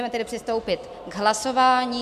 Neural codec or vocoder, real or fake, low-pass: none; real; 14.4 kHz